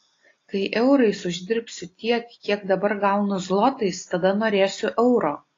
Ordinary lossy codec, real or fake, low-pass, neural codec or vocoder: AAC, 32 kbps; real; 7.2 kHz; none